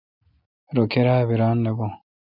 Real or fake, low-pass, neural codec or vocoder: real; 5.4 kHz; none